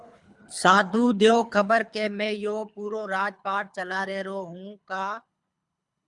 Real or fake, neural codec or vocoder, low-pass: fake; codec, 24 kHz, 3 kbps, HILCodec; 10.8 kHz